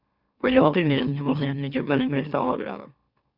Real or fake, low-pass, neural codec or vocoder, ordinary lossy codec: fake; 5.4 kHz; autoencoder, 44.1 kHz, a latent of 192 numbers a frame, MeloTTS; Opus, 64 kbps